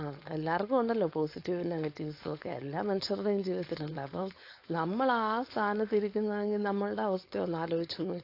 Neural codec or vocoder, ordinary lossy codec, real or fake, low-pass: codec, 16 kHz, 4.8 kbps, FACodec; AAC, 32 kbps; fake; 5.4 kHz